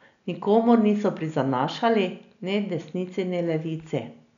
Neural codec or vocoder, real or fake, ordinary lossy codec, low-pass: none; real; none; 7.2 kHz